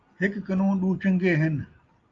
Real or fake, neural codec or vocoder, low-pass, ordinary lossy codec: real; none; 7.2 kHz; Opus, 32 kbps